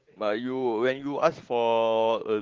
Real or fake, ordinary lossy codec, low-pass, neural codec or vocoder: fake; Opus, 16 kbps; 7.2 kHz; vocoder, 44.1 kHz, 128 mel bands every 512 samples, BigVGAN v2